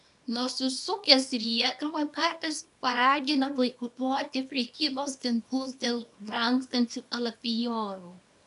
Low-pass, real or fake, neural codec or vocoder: 10.8 kHz; fake; codec, 24 kHz, 0.9 kbps, WavTokenizer, small release